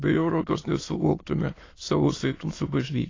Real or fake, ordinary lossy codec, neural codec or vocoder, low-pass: fake; AAC, 32 kbps; autoencoder, 22.05 kHz, a latent of 192 numbers a frame, VITS, trained on many speakers; 7.2 kHz